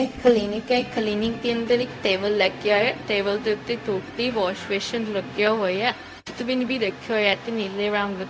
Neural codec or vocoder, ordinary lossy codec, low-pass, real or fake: codec, 16 kHz, 0.4 kbps, LongCat-Audio-Codec; none; none; fake